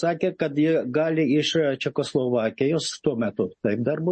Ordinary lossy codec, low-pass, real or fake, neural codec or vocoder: MP3, 32 kbps; 10.8 kHz; real; none